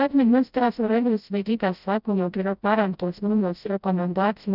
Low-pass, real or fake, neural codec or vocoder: 5.4 kHz; fake; codec, 16 kHz, 0.5 kbps, FreqCodec, smaller model